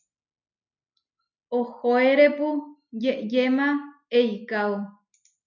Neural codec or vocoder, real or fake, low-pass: none; real; 7.2 kHz